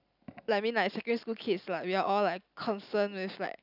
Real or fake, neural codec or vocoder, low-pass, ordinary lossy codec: real; none; 5.4 kHz; none